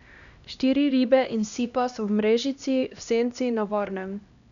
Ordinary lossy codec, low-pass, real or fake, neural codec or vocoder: none; 7.2 kHz; fake; codec, 16 kHz, 1 kbps, X-Codec, HuBERT features, trained on LibriSpeech